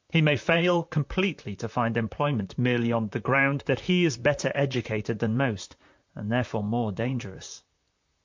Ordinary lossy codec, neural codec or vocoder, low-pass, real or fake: MP3, 48 kbps; vocoder, 44.1 kHz, 128 mel bands, Pupu-Vocoder; 7.2 kHz; fake